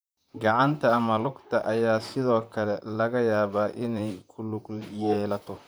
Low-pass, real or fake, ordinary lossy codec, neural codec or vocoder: none; fake; none; vocoder, 44.1 kHz, 128 mel bands, Pupu-Vocoder